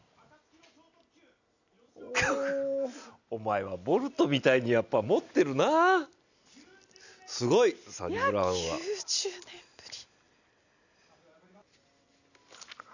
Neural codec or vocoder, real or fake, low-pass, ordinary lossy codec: none; real; 7.2 kHz; none